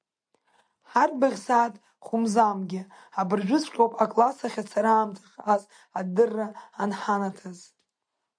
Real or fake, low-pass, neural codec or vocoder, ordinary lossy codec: real; 9.9 kHz; none; MP3, 64 kbps